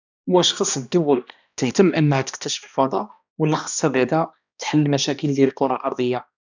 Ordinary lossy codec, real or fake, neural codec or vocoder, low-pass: none; fake; codec, 16 kHz, 1 kbps, X-Codec, HuBERT features, trained on balanced general audio; 7.2 kHz